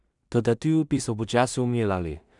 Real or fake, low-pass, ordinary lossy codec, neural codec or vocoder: fake; 10.8 kHz; none; codec, 16 kHz in and 24 kHz out, 0.4 kbps, LongCat-Audio-Codec, two codebook decoder